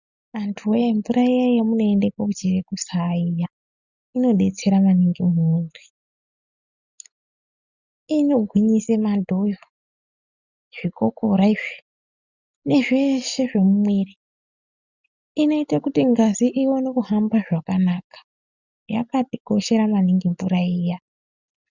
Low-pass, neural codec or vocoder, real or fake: 7.2 kHz; none; real